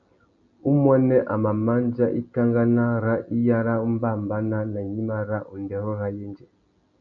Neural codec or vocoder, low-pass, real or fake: none; 7.2 kHz; real